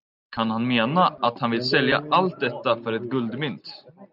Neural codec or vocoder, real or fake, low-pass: none; real; 5.4 kHz